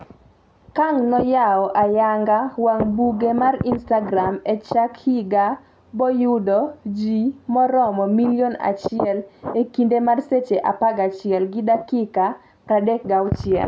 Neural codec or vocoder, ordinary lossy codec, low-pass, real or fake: none; none; none; real